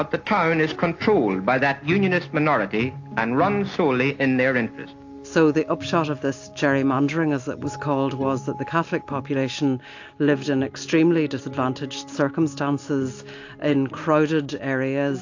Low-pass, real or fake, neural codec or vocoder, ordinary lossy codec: 7.2 kHz; real; none; AAC, 48 kbps